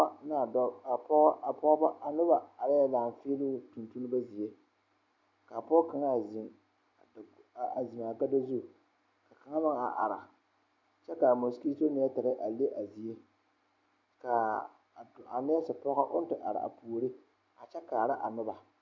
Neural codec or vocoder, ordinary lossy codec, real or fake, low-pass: none; MP3, 64 kbps; real; 7.2 kHz